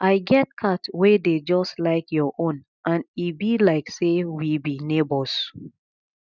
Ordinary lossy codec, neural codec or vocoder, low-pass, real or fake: none; none; 7.2 kHz; real